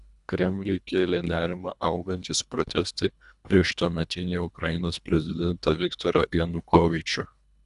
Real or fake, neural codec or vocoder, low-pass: fake; codec, 24 kHz, 1.5 kbps, HILCodec; 10.8 kHz